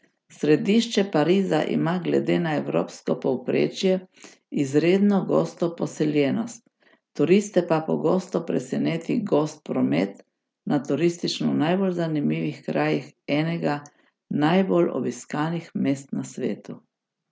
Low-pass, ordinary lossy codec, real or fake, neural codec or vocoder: none; none; real; none